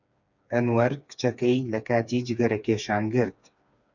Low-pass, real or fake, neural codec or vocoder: 7.2 kHz; fake; codec, 16 kHz, 4 kbps, FreqCodec, smaller model